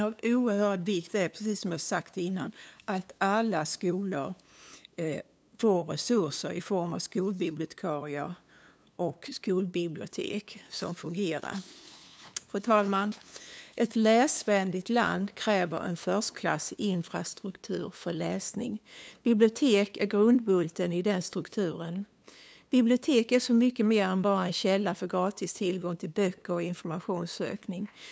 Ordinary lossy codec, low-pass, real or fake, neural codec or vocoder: none; none; fake; codec, 16 kHz, 2 kbps, FunCodec, trained on LibriTTS, 25 frames a second